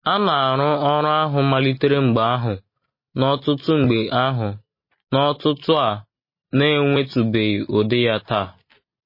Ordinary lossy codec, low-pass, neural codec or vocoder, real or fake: MP3, 24 kbps; 5.4 kHz; none; real